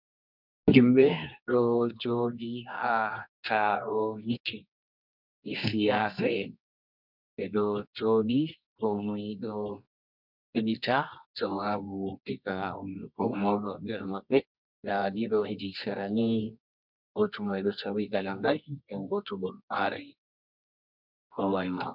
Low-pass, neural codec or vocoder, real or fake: 5.4 kHz; codec, 24 kHz, 0.9 kbps, WavTokenizer, medium music audio release; fake